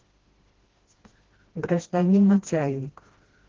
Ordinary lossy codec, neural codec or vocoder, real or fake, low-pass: Opus, 16 kbps; codec, 16 kHz, 1 kbps, FreqCodec, smaller model; fake; 7.2 kHz